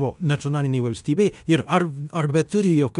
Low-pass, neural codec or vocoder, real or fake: 10.8 kHz; codec, 16 kHz in and 24 kHz out, 0.9 kbps, LongCat-Audio-Codec, fine tuned four codebook decoder; fake